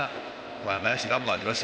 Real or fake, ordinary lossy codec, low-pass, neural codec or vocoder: fake; none; none; codec, 16 kHz, 0.8 kbps, ZipCodec